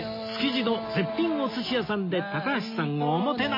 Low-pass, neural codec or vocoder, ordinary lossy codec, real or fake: 5.4 kHz; none; MP3, 24 kbps; real